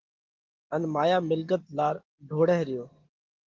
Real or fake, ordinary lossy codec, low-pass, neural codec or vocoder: real; Opus, 16 kbps; 7.2 kHz; none